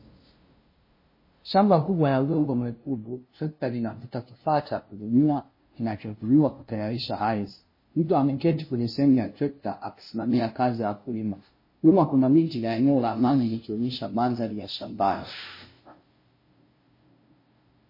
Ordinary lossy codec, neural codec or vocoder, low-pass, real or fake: MP3, 24 kbps; codec, 16 kHz, 0.5 kbps, FunCodec, trained on LibriTTS, 25 frames a second; 5.4 kHz; fake